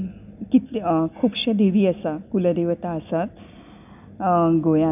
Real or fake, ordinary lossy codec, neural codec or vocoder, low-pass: real; none; none; 3.6 kHz